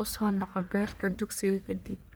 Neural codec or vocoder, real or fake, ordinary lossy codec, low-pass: codec, 44.1 kHz, 1.7 kbps, Pupu-Codec; fake; none; none